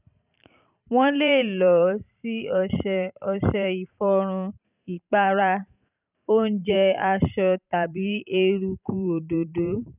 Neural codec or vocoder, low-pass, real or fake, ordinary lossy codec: vocoder, 44.1 kHz, 128 mel bands every 512 samples, BigVGAN v2; 3.6 kHz; fake; none